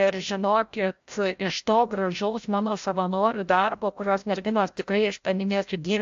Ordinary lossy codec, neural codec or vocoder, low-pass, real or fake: AAC, 64 kbps; codec, 16 kHz, 0.5 kbps, FreqCodec, larger model; 7.2 kHz; fake